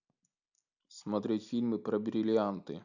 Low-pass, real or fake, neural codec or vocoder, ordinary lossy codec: 7.2 kHz; real; none; none